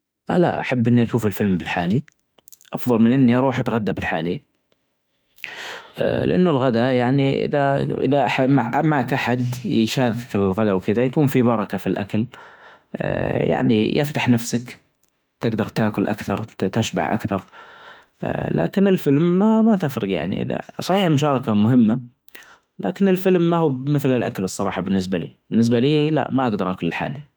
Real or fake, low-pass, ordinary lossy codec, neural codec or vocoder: fake; none; none; autoencoder, 48 kHz, 32 numbers a frame, DAC-VAE, trained on Japanese speech